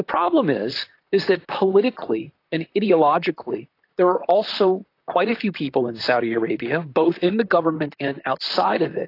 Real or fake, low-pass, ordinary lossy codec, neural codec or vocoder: fake; 5.4 kHz; AAC, 32 kbps; vocoder, 44.1 kHz, 128 mel bands, Pupu-Vocoder